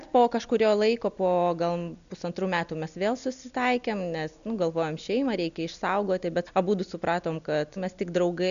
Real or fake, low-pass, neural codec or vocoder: real; 7.2 kHz; none